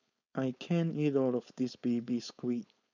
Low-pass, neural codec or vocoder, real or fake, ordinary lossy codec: 7.2 kHz; codec, 16 kHz, 4.8 kbps, FACodec; fake; none